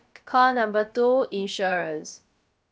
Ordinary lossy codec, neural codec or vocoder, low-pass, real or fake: none; codec, 16 kHz, about 1 kbps, DyCAST, with the encoder's durations; none; fake